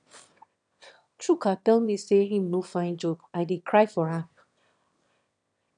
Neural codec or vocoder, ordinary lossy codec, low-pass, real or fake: autoencoder, 22.05 kHz, a latent of 192 numbers a frame, VITS, trained on one speaker; none; 9.9 kHz; fake